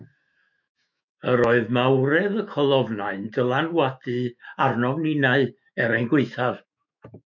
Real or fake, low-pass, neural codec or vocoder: fake; 7.2 kHz; autoencoder, 48 kHz, 128 numbers a frame, DAC-VAE, trained on Japanese speech